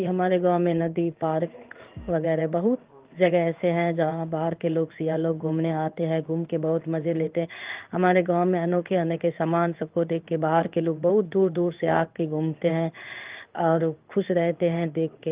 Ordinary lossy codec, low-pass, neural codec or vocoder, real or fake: Opus, 32 kbps; 3.6 kHz; codec, 16 kHz in and 24 kHz out, 1 kbps, XY-Tokenizer; fake